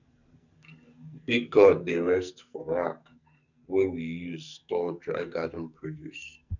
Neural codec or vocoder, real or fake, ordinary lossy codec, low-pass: codec, 44.1 kHz, 2.6 kbps, SNAC; fake; none; 7.2 kHz